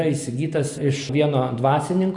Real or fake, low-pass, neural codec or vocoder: real; 10.8 kHz; none